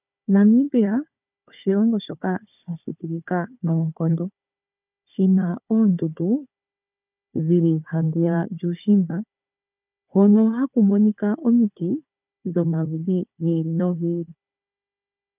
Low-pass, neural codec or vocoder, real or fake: 3.6 kHz; codec, 16 kHz, 4 kbps, FunCodec, trained on Chinese and English, 50 frames a second; fake